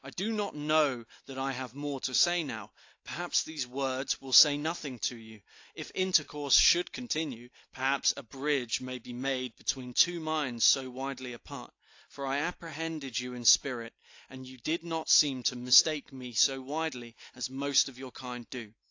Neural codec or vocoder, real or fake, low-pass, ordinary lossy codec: none; real; 7.2 kHz; AAC, 48 kbps